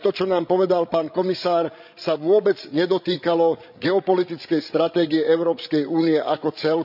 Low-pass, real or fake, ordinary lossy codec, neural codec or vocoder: 5.4 kHz; fake; none; codec, 16 kHz, 16 kbps, FreqCodec, larger model